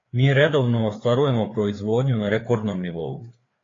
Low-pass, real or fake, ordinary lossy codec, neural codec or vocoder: 7.2 kHz; fake; AAC, 32 kbps; codec, 16 kHz, 4 kbps, FreqCodec, larger model